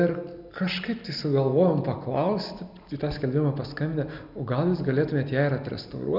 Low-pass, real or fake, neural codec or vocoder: 5.4 kHz; real; none